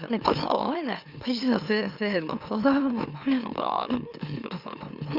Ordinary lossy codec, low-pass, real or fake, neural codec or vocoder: Opus, 64 kbps; 5.4 kHz; fake; autoencoder, 44.1 kHz, a latent of 192 numbers a frame, MeloTTS